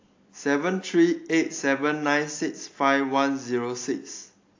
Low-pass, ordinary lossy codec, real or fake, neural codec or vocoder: 7.2 kHz; AAC, 48 kbps; real; none